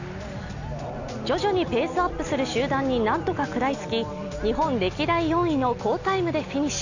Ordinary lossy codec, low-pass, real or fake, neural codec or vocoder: none; 7.2 kHz; real; none